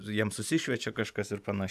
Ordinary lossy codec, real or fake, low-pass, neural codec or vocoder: AAC, 96 kbps; real; 14.4 kHz; none